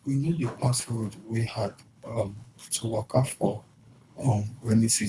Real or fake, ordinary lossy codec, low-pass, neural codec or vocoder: fake; none; none; codec, 24 kHz, 3 kbps, HILCodec